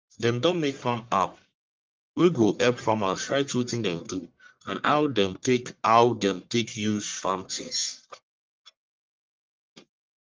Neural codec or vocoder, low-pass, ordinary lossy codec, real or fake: codec, 44.1 kHz, 1.7 kbps, Pupu-Codec; 7.2 kHz; Opus, 24 kbps; fake